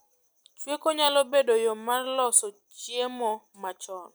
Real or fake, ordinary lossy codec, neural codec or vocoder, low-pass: real; none; none; none